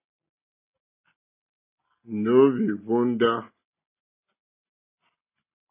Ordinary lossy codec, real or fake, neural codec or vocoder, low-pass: AAC, 24 kbps; real; none; 3.6 kHz